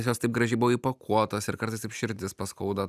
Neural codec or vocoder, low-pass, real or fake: none; 14.4 kHz; real